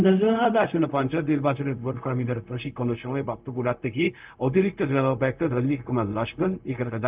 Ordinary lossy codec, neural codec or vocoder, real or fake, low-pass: Opus, 24 kbps; codec, 16 kHz, 0.4 kbps, LongCat-Audio-Codec; fake; 3.6 kHz